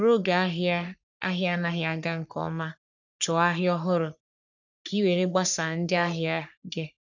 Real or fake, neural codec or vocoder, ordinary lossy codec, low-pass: fake; codec, 44.1 kHz, 3.4 kbps, Pupu-Codec; none; 7.2 kHz